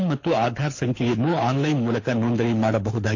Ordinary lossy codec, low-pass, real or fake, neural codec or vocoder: AAC, 48 kbps; 7.2 kHz; fake; codec, 24 kHz, 6 kbps, HILCodec